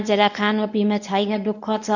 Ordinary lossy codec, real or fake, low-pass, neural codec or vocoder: none; fake; 7.2 kHz; codec, 24 kHz, 0.9 kbps, WavTokenizer, medium speech release version 1